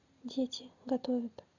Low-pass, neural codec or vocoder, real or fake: 7.2 kHz; none; real